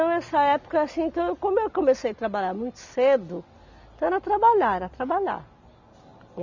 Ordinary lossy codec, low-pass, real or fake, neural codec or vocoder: none; 7.2 kHz; real; none